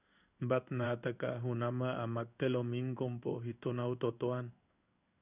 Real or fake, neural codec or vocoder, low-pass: fake; codec, 16 kHz in and 24 kHz out, 1 kbps, XY-Tokenizer; 3.6 kHz